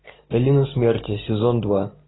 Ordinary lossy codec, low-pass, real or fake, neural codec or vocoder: AAC, 16 kbps; 7.2 kHz; real; none